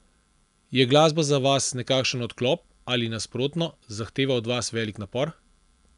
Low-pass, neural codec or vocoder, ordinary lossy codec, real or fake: 10.8 kHz; none; none; real